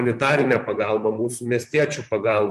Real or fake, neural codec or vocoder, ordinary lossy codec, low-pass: fake; vocoder, 44.1 kHz, 128 mel bands, Pupu-Vocoder; MP3, 64 kbps; 14.4 kHz